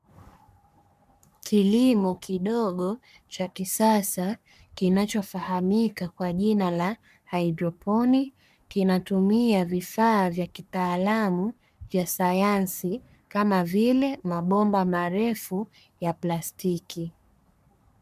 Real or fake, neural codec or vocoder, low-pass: fake; codec, 44.1 kHz, 3.4 kbps, Pupu-Codec; 14.4 kHz